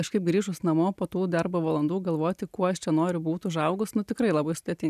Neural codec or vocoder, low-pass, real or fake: none; 14.4 kHz; real